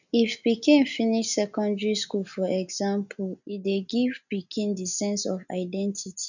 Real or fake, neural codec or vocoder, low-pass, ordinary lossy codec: real; none; 7.2 kHz; none